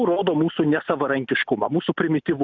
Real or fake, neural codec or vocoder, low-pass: real; none; 7.2 kHz